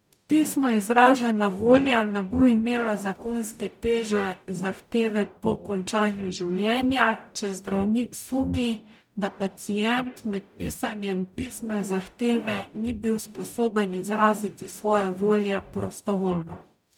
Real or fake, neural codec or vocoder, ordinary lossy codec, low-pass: fake; codec, 44.1 kHz, 0.9 kbps, DAC; none; 19.8 kHz